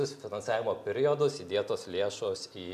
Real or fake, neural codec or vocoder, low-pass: real; none; 14.4 kHz